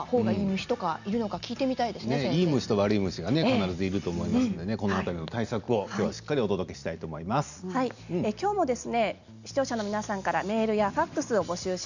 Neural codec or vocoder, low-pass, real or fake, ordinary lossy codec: none; 7.2 kHz; real; none